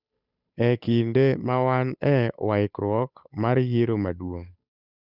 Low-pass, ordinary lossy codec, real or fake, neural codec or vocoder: 5.4 kHz; none; fake; codec, 16 kHz, 8 kbps, FunCodec, trained on Chinese and English, 25 frames a second